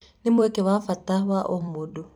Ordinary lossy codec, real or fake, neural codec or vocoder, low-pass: none; fake; vocoder, 44.1 kHz, 128 mel bands every 256 samples, BigVGAN v2; 19.8 kHz